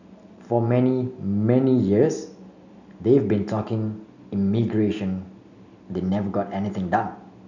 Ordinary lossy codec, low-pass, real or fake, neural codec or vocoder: none; 7.2 kHz; real; none